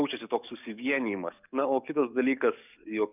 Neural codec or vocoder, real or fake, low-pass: codec, 44.1 kHz, 7.8 kbps, DAC; fake; 3.6 kHz